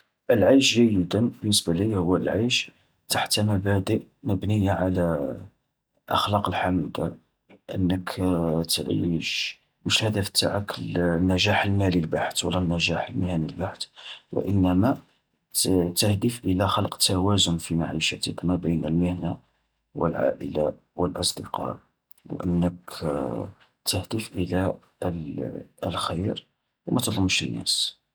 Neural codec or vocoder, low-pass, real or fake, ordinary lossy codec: none; none; real; none